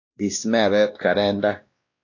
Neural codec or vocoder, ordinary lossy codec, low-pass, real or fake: codec, 16 kHz, 1 kbps, X-Codec, WavLM features, trained on Multilingual LibriSpeech; AAC, 48 kbps; 7.2 kHz; fake